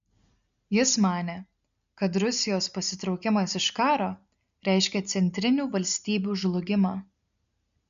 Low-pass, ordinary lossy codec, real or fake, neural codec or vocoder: 7.2 kHz; AAC, 96 kbps; real; none